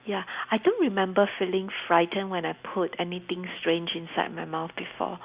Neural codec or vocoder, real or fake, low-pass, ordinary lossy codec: none; real; 3.6 kHz; Opus, 64 kbps